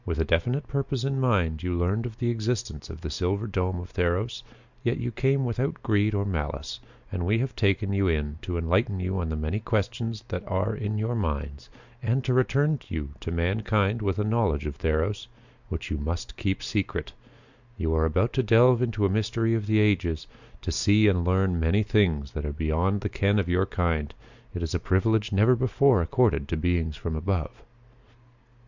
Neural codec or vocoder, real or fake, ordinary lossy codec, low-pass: none; real; Opus, 64 kbps; 7.2 kHz